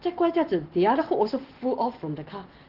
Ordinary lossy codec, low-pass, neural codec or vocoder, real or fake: Opus, 16 kbps; 5.4 kHz; none; real